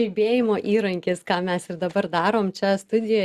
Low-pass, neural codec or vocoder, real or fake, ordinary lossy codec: 14.4 kHz; none; real; Opus, 64 kbps